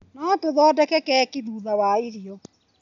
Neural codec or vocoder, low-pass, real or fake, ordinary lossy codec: none; 7.2 kHz; real; none